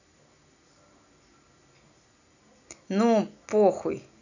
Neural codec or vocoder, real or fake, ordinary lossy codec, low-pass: none; real; none; 7.2 kHz